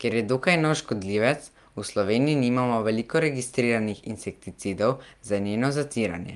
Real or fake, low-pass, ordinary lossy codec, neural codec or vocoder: real; 10.8 kHz; Opus, 32 kbps; none